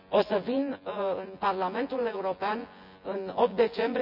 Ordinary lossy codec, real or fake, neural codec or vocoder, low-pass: none; fake; vocoder, 24 kHz, 100 mel bands, Vocos; 5.4 kHz